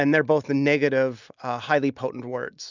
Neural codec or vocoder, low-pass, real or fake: none; 7.2 kHz; real